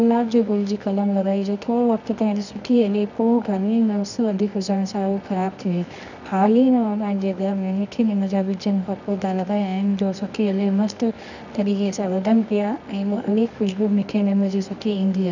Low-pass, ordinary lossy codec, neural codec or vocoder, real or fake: 7.2 kHz; none; codec, 24 kHz, 0.9 kbps, WavTokenizer, medium music audio release; fake